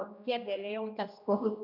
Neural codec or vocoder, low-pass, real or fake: codec, 16 kHz, 1 kbps, X-Codec, HuBERT features, trained on general audio; 5.4 kHz; fake